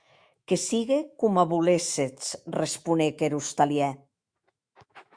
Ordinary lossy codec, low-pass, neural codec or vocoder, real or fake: Opus, 64 kbps; 9.9 kHz; autoencoder, 48 kHz, 128 numbers a frame, DAC-VAE, trained on Japanese speech; fake